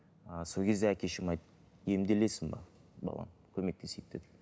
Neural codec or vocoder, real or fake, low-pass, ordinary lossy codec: none; real; none; none